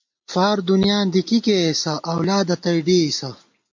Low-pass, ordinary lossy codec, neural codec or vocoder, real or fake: 7.2 kHz; MP3, 48 kbps; none; real